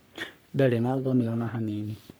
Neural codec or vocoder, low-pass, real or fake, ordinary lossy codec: codec, 44.1 kHz, 3.4 kbps, Pupu-Codec; none; fake; none